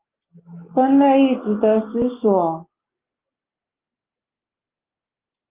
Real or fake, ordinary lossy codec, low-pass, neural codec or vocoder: real; Opus, 24 kbps; 3.6 kHz; none